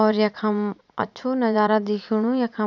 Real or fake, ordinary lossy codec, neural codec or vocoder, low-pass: fake; none; vocoder, 44.1 kHz, 80 mel bands, Vocos; 7.2 kHz